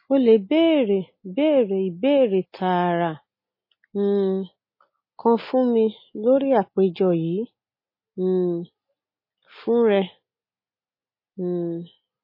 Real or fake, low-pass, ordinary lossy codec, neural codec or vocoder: real; 5.4 kHz; MP3, 24 kbps; none